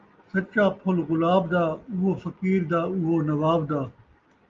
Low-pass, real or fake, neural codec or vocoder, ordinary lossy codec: 7.2 kHz; real; none; Opus, 32 kbps